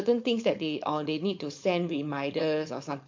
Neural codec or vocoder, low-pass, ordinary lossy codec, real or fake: codec, 16 kHz, 4.8 kbps, FACodec; 7.2 kHz; MP3, 48 kbps; fake